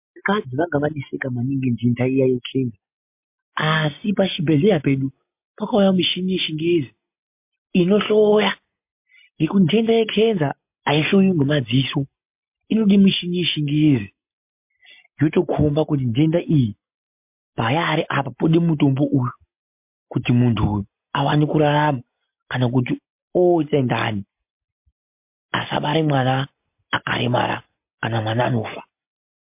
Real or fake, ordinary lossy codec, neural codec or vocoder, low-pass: real; MP3, 32 kbps; none; 3.6 kHz